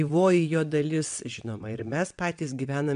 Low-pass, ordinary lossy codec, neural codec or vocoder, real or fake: 9.9 kHz; MP3, 64 kbps; vocoder, 22.05 kHz, 80 mel bands, WaveNeXt; fake